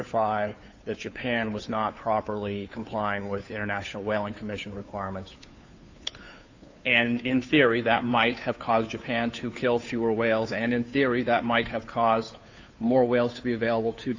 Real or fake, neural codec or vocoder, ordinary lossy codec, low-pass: fake; codec, 16 kHz, 2 kbps, FunCodec, trained on Chinese and English, 25 frames a second; AAC, 48 kbps; 7.2 kHz